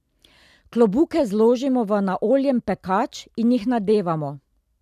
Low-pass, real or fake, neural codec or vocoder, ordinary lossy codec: 14.4 kHz; real; none; none